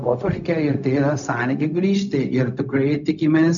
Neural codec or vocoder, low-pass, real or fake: codec, 16 kHz, 0.4 kbps, LongCat-Audio-Codec; 7.2 kHz; fake